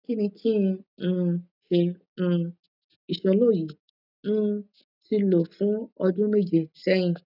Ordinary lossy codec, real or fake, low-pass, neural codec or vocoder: none; real; 5.4 kHz; none